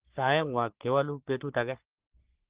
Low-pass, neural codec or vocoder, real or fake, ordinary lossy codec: 3.6 kHz; codec, 44.1 kHz, 3.4 kbps, Pupu-Codec; fake; Opus, 16 kbps